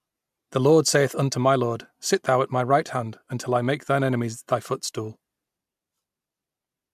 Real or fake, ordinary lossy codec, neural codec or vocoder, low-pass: real; MP3, 96 kbps; none; 14.4 kHz